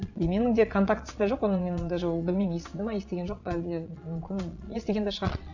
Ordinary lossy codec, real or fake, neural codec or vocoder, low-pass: none; fake; vocoder, 22.05 kHz, 80 mel bands, Vocos; 7.2 kHz